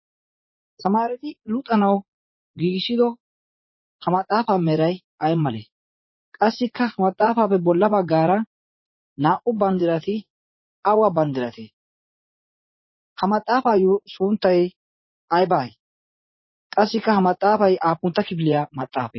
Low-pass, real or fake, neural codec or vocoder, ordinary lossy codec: 7.2 kHz; fake; vocoder, 44.1 kHz, 80 mel bands, Vocos; MP3, 24 kbps